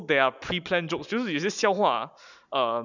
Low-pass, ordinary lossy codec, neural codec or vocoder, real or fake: 7.2 kHz; none; none; real